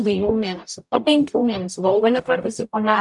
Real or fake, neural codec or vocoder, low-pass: fake; codec, 44.1 kHz, 0.9 kbps, DAC; 10.8 kHz